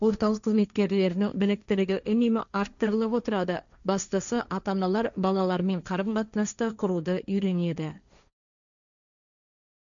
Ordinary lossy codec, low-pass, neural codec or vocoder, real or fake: none; 7.2 kHz; codec, 16 kHz, 1.1 kbps, Voila-Tokenizer; fake